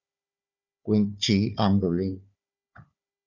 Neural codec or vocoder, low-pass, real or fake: codec, 16 kHz, 4 kbps, FunCodec, trained on Chinese and English, 50 frames a second; 7.2 kHz; fake